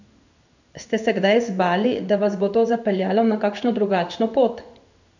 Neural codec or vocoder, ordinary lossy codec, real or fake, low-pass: codec, 16 kHz in and 24 kHz out, 1 kbps, XY-Tokenizer; none; fake; 7.2 kHz